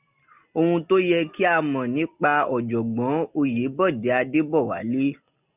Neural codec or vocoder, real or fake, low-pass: none; real; 3.6 kHz